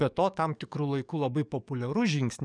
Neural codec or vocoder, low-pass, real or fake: codec, 44.1 kHz, 7.8 kbps, DAC; 9.9 kHz; fake